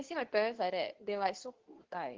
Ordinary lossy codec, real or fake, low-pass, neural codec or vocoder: Opus, 16 kbps; fake; 7.2 kHz; codec, 24 kHz, 0.9 kbps, WavTokenizer, medium speech release version 2